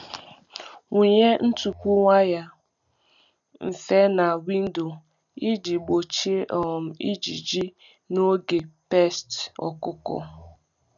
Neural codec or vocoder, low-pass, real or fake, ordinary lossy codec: none; 7.2 kHz; real; none